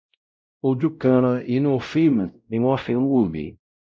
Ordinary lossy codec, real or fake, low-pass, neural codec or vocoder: none; fake; none; codec, 16 kHz, 0.5 kbps, X-Codec, WavLM features, trained on Multilingual LibriSpeech